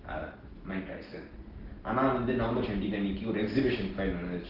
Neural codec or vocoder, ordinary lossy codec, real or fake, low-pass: none; Opus, 16 kbps; real; 5.4 kHz